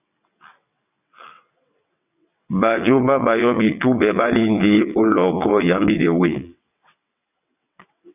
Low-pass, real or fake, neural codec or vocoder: 3.6 kHz; fake; vocoder, 22.05 kHz, 80 mel bands, WaveNeXt